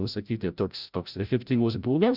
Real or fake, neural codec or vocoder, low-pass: fake; codec, 16 kHz, 0.5 kbps, FreqCodec, larger model; 5.4 kHz